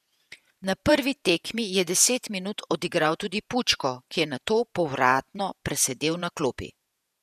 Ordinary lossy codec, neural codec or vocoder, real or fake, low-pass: none; vocoder, 48 kHz, 128 mel bands, Vocos; fake; 14.4 kHz